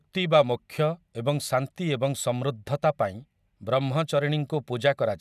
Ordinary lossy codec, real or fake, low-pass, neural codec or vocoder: none; real; 14.4 kHz; none